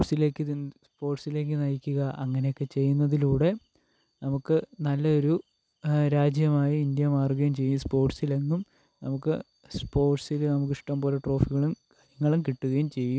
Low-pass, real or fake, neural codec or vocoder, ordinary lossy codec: none; real; none; none